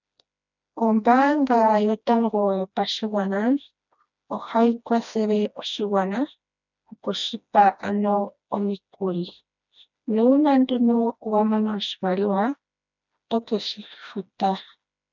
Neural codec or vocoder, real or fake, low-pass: codec, 16 kHz, 1 kbps, FreqCodec, smaller model; fake; 7.2 kHz